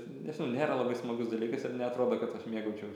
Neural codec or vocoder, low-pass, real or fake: none; 19.8 kHz; real